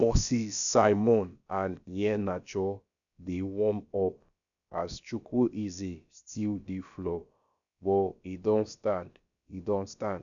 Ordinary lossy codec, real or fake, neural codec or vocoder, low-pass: none; fake; codec, 16 kHz, about 1 kbps, DyCAST, with the encoder's durations; 7.2 kHz